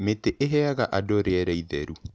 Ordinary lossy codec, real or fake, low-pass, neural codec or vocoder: none; real; none; none